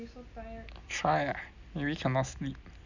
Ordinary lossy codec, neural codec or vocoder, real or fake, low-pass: none; none; real; 7.2 kHz